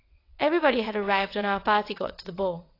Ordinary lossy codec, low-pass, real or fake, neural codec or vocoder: AAC, 32 kbps; 5.4 kHz; fake; vocoder, 22.05 kHz, 80 mel bands, WaveNeXt